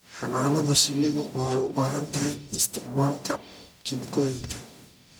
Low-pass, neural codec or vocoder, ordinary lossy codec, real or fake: none; codec, 44.1 kHz, 0.9 kbps, DAC; none; fake